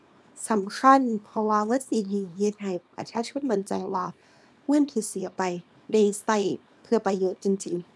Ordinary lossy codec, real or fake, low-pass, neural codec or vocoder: none; fake; none; codec, 24 kHz, 0.9 kbps, WavTokenizer, small release